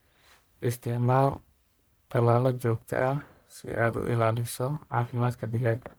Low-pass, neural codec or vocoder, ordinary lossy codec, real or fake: none; codec, 44.1 kHz, 1.7 kbps, Pupu-Codec; none; fake